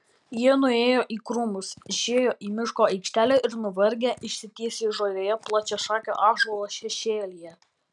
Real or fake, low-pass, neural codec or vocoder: real; 10.8 kHz; none